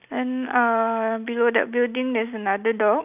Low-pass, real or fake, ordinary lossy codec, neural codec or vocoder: 3.6 kHz; real; none; none